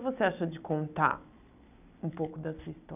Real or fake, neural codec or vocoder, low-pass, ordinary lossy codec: real; none; 3.6 kHz; none